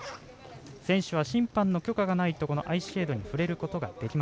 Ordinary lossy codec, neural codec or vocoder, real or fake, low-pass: none; none; real; none